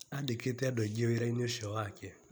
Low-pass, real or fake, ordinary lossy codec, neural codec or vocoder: none; real; none; none